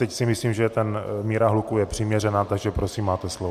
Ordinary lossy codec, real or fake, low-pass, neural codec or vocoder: MP3, 96 kbps; real; 14.4 kHz; none